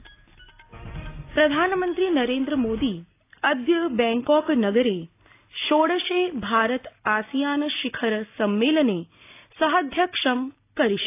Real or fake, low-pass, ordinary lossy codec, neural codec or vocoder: real; 3.6 kHz; AAC, 24 kbps; none